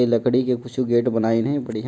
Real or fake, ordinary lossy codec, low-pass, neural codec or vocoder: real; none; none; none